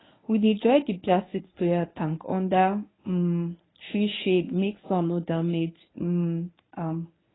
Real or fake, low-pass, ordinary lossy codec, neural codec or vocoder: fake; 7.2 kHz; AAC, 16 kbps; codec, 24 kHz, 0.9 kbps, WavTokenizer, medium speech release version 1